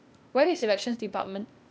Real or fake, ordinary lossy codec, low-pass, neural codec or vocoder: fake; none; none; codec, 16 kHz, 0.8 kbps, ZipCodec